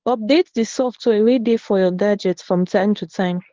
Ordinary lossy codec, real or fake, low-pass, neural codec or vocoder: Opus, 16 kbps; fake; 7.2 kHz; codec, 24 kHz, 0.9 kbps, WavTokenizer, medium speech release version 1